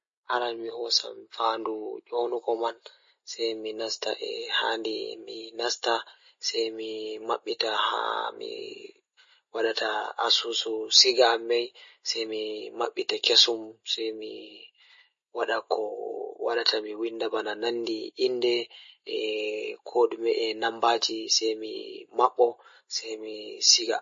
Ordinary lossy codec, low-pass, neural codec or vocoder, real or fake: MP3, 32 kbps; 7.2 kHz; none; real